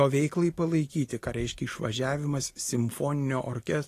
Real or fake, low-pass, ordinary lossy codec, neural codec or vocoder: real; 14.4 kHz; AAC, 48 kbps; none